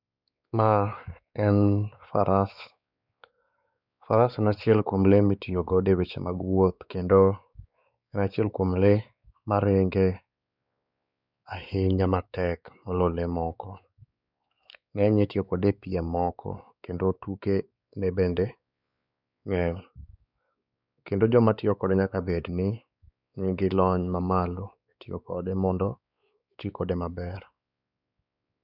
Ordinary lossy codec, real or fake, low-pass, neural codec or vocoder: none; fake; 5.4 kHz; codec, 16 kHz, 4 kbps, X-Codec, WavLM features, trained on Multilingual LibriSpeech